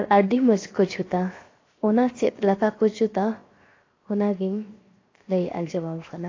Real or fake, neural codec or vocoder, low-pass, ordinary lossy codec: fake; codec, 16 kHz, 0.7 kbps, FocalCodec; 7.2 kHz; AAC, 32 kbps